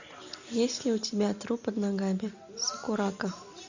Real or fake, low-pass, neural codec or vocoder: real; 7.2 kHz; none